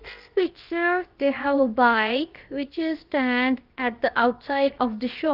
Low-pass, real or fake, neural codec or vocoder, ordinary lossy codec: 5.4 kHz; fake; codec, 16 kHz, about 1 kbps, DyCAST, with the encoder's durations; Opus, 32 kbps